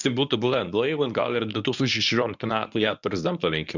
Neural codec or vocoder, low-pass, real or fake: codec, 24 kHz, 0.9 kbps, WavTokenizer, medium speech release version 1; 7.2 kHz; fake